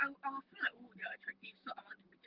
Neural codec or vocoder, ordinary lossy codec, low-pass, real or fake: vocoder, 44.1 kHz, 128 mel bands, Pupu-Vocoder; Opus, 32 kbps; 5.4 kHz; fake